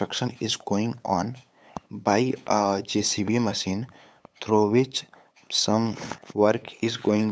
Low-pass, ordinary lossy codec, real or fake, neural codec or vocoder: none; none; fake; codec, 16 kHz, 8 kbps, FunCodec, trained on LibriTTS, 25 frames a second